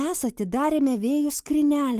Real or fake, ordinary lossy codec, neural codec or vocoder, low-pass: fake; Opus, 24 kbps; autoencoder, 48 kHz, 128 numbers a frame, DAC-VAE, trained on Japanese speech; 14.4 kHz